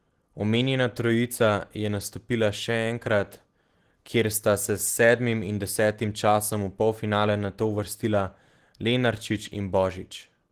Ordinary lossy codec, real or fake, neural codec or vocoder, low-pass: Opus, 16 kbps; real; none; 14.4 kHz